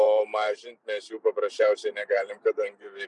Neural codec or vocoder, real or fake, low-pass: vocoder, 44.1 kHz, 128 mel bands every 512 samples, BigVGAN v2; fake; 10.8 kHz